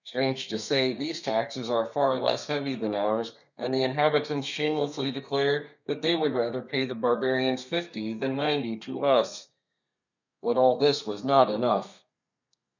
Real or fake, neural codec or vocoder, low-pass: fake; codec, 32 kHz, 1.9 kbps, SNAC; 7.2 kHz